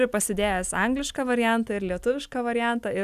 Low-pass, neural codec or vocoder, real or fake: 14.4 kHz; none; real